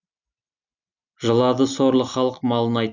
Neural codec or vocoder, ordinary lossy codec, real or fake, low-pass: none; none; real; 7.2 kHz